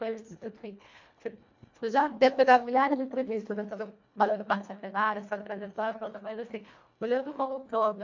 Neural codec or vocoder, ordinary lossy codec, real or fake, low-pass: codec, 24 kHz, 1.5 kbps, HILCodec; MP3, 48 kbps; fake; 7.2 kHz